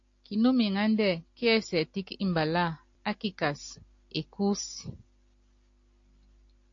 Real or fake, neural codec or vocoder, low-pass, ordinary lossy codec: real; none; 7.2 kHz; AAC, 48 kbps